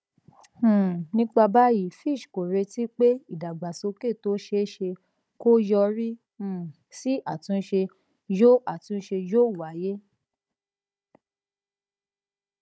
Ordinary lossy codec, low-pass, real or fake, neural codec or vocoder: none; none; fake; codec, 16 kHz, 16 kbps, FunCodec, trained on Chinese and English, 50 frames a second